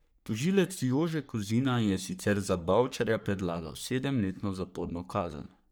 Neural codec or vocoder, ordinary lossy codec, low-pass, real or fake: codec, 44.1 kHz, 3.4 kbps, Pupu-Codec; none; none; fake